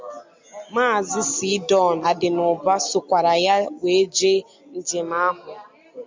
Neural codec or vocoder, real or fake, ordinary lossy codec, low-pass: none; real; MP3, 64 kbps; 7.2 kHz